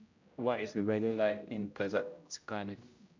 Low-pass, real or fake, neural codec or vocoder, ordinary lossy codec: 7.2 kHz; fake; codec, 16 kHz, 0.5 kbps, X-Codec, HuBERT features, trained on general audio; MP3, 64 kbps